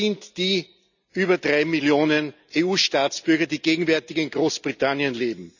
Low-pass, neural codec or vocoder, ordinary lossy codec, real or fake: 7.2 kHz; none; none; real